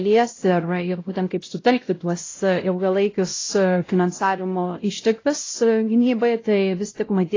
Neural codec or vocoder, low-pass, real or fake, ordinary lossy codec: codec, 16 kHz, 0.5 kbps, X-Codec, WavLM features, trained on Multilingual LibriSpeech; 7.2 kHz; fake; AAC, 32 kbps